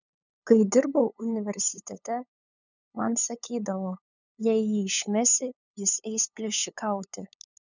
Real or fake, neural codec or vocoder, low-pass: fake; codec, 16 kHz, 8 kbps, FunCodec, trained on LibriTTS, 25 frames a second; 7.2 kHz